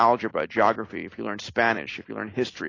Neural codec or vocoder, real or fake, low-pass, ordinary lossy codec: none; real; 7.2 kHz; AAC, 32 kbps